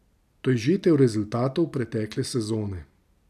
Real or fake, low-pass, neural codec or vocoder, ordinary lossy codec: real; 14.4 kHz; none; none